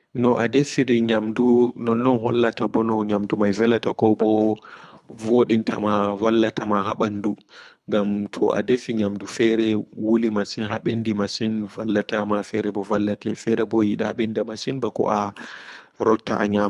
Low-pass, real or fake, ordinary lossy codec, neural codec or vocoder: none; fake; none; codec, 24 kHz, 3 kbps, HILCodec